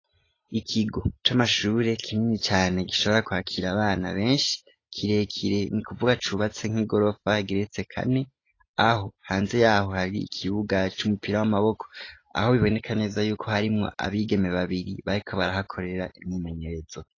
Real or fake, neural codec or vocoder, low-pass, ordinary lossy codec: real; none; 7.2 kHz; AAC, 32 kbps